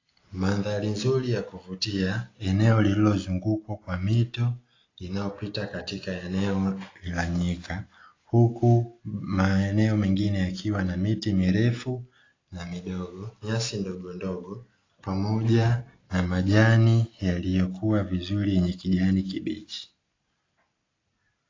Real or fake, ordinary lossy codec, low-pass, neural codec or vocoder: real; AAC, 32 kbps; 7.2 kHz; none